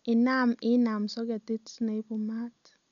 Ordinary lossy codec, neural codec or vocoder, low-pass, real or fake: none; none; 7.2 kHz; real